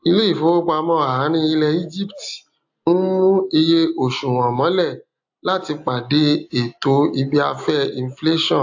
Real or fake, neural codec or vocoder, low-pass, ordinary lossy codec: real; none; 7.2 kHz; AAC, 48 kbps